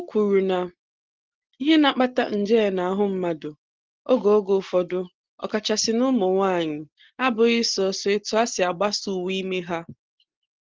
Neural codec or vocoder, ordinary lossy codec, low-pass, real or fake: none; Opus, 16 kbps; 7.2 kHz; real